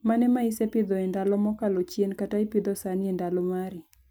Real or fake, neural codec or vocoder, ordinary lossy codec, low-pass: real; none; none; none